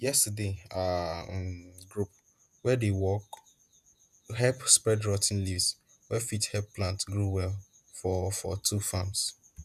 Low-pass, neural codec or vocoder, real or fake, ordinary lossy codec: 14.4 kHz; vocoder, 48 kHz, 128 mel bands, Vocos; fake; none